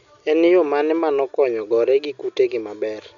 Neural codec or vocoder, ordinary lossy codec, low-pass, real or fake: none; MP3, 64 kbps; 7.2 kHz; real